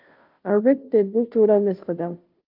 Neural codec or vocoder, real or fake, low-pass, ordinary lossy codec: codec, 16 kHz, 0.5 kbps, FunCodec, trained on Chinese and English, 25 frames a second; fake; 5.4 kHz; Opus, 32 kbps